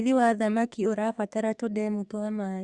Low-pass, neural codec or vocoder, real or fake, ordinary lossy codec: 10.8 kHz; codec, 32 kHz, 1.9 kbps, SNAC; fake; Opus, 64 kbps